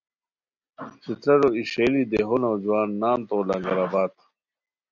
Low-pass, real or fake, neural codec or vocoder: 7.2 kHz; real; none